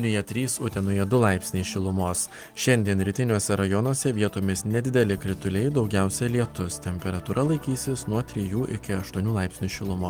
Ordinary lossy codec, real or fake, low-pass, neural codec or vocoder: Opus, 24 kbps; real; 19.8 kHz; none